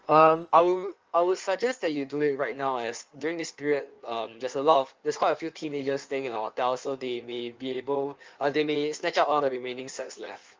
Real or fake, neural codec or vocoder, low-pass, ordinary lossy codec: fake; codec, 16 kHz in and 24 kHz out, 1.1 kbps, FireRedTTS-2 codec; 7.2 kHz; Opus, 24 kbps